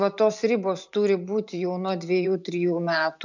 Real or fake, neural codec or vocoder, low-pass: fake; vocoder, 44.1 kHz, 128 mel bands every 512 samples, BigVGAN v2; 7.2 kHz